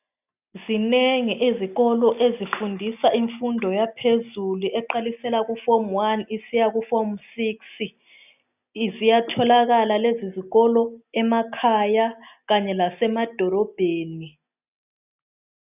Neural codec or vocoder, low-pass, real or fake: none; 3.6 kHz; real